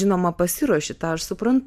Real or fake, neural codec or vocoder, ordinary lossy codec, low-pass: real; none; AAC, 96 kbps; 14.4 kHz